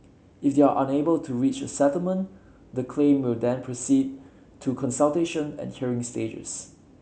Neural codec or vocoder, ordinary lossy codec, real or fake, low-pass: none; none; real; none